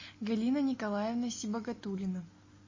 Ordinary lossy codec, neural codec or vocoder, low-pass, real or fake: MP3, 32 kbps; none; 7.2 kHz; real